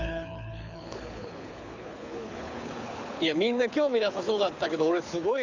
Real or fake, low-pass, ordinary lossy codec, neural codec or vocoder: fake; 7.2 kHz; Opus, 64 kbps; codec, 24 kHz, 6 kbps, HILCodec